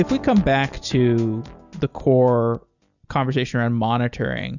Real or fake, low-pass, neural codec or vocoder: real; 7.2 kHz; none